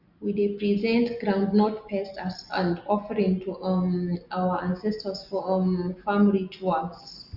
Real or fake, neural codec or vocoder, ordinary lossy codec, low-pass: real; none; Opus, 64 kbps; 5.4 kHz